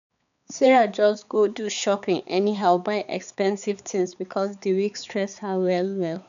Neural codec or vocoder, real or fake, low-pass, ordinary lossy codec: codec, 16 kHz, 4 kbps, X-Codec, HuBERT features, trained on balanced general audio; fake; 7.2 kHz; none